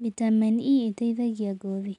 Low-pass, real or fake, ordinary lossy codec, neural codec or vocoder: 10.8 kHz; real; none; none